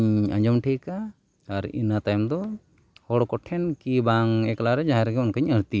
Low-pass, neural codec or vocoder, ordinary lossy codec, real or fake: none; none; none; real